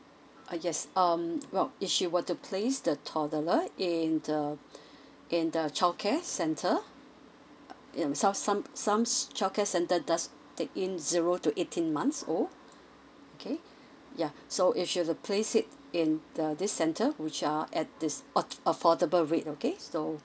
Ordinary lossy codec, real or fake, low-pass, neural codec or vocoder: none; real; none; none